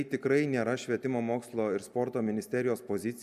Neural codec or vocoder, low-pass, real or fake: none; 14.4 kHz; real